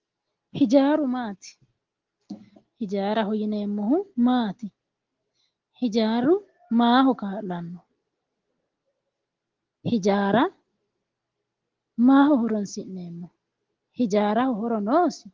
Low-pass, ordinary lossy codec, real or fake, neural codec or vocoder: 7.2 kHz; Opus, 16 kbps; real; none